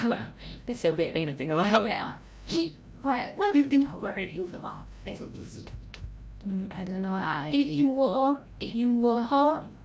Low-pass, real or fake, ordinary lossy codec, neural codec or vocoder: none; fake; none; codec, 16 kHz, 0.5 kbps, FreqCodec, larger model